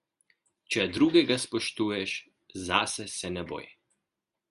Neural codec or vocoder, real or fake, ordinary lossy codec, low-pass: none; real; Opus, 64 kbps; 10.8 kHz